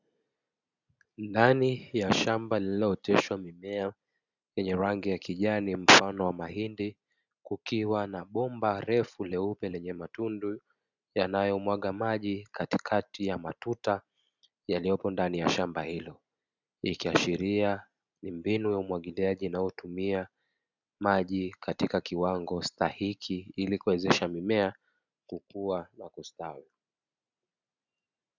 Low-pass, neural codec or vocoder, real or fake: 7.2 kHz; none; real